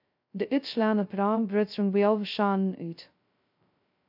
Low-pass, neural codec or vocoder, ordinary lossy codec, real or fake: 5.4 kHz; codec, 16 kHz, 0.2 kbps, FocalCodec; MP3, 48 kbps; fake